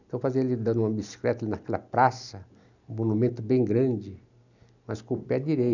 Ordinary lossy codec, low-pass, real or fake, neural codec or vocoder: none; 7.2 kHz; real; none